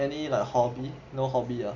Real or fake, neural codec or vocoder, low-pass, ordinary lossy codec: real; none; 7.2 kHz; none